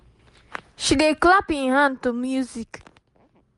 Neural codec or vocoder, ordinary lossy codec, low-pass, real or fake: none; MP3, 64 kbps; 10.8 kHz; real